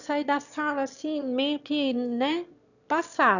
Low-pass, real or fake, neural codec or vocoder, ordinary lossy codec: 7.2 kHz; fake; autoencoder, 22.05 kHz, a latent of 192 numbers a frame, VITS, trained on one speaker; none